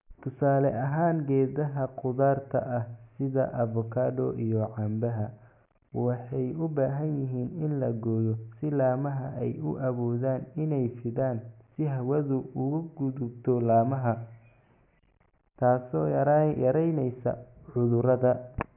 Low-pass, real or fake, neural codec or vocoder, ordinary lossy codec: 3.6 kHz; real; none; none